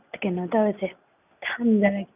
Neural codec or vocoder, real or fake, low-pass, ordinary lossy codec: none; real; 3.6 kHz; none